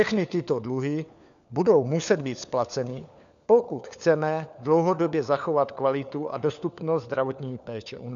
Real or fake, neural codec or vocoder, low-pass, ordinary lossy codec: fake; codec, 16 kHz, 4 kbps, FunCodec, trained on LibriTTS, 50 frames a second; 7.2 kHz; MP3, 96 kbps